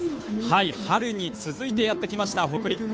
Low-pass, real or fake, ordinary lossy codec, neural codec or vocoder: none; fake; none; codec, 16 kHz, 2 kbps, FunCodec, trained on Chinese and English, 25 frames a second